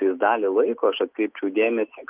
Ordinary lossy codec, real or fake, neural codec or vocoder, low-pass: Opus, 24 kbps; real; none; 3.6 kHz